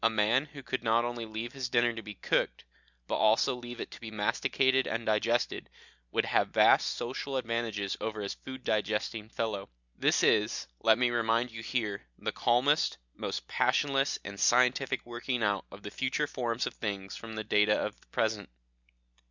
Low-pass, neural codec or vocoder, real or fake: 7.2 kHz; none; real